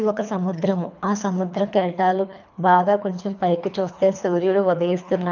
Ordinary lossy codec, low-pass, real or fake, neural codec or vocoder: none; 7.2 kHz; fake; codec, 24 kHz, 3 kbps, HILCodec